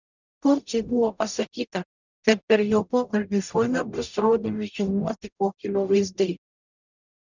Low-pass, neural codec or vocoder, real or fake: 7.2 kHz; codec, 44.1 kHz, 0.9 kbps, DAC; fake